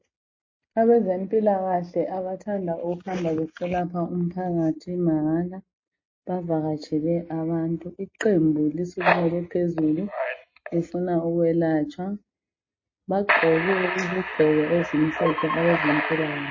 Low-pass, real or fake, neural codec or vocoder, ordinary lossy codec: 7.2 kHz; real; none; MP3, 32 kbps